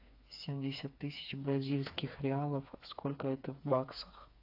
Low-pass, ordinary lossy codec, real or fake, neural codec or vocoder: 5.4 kHz; AAC, 32 kbps; fake; codec, 16 kHz, 4 kbps, FreqCodec, smaller model